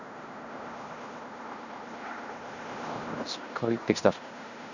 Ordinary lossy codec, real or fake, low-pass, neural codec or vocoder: none; fake; 7.2 kHz; codec, 16 kHz in and 24 kHz out, 0.9 kbps, LongCat-Audio-Codec, fine tuned four codebook decoder